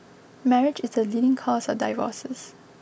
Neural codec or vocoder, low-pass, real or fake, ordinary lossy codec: none; none; real; none